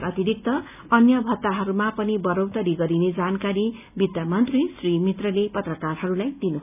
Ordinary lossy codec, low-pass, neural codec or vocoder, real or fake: none; 3.6 kHz; none; real